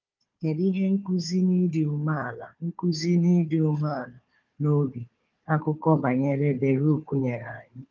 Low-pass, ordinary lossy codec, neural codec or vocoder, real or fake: 7.2 kHz; Opus, 24 kbps; codec, 16 kHz, 4 kbps, FunCodec, trained on Chinese and English, 50 frames a second; fake